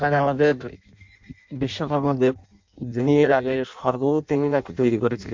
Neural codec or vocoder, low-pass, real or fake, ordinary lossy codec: codec, 16 kHz in and 24 kHz out, 0.6 kbps, FireRedTTS-2 codec; 7.2 kHz; fake; MP3, 48 kbps